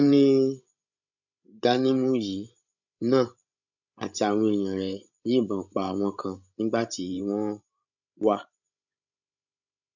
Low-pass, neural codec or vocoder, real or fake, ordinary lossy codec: 7.2 kHz; codec, 16 kHz, 16 kbps, FreqCodec, larger model; fake; none